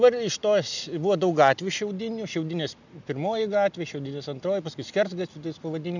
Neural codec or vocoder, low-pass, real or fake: none; 7.2 kHz; real